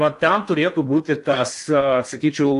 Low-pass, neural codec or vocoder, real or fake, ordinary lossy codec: 10.8 kHz; codec, 16 kHz in and 24 kHz out, 0.8 kbps, FocalCodec, streaming, 65536 codes; fake; AAC, 64 kbps